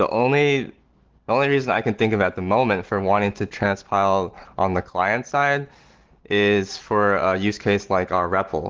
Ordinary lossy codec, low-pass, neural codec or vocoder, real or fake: Opus, 16 kbps; 7.2 kHz; none; real